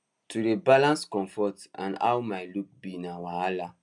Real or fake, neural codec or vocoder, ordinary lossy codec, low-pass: real; none; none; 10.8 kHz